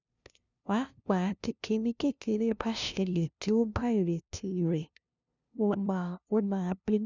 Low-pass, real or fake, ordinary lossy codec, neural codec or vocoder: 7.2 kHz; fake; none; codec, 16 kHz, 0.5 kbps, FunCodec, trained on LibriTTS, 25 frames a second